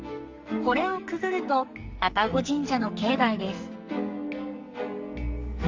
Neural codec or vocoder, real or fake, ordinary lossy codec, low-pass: codec, 44.1 kHz, 2.6 kbps, SNAC; fake; Opus, 32 kbps; 7.2 kHz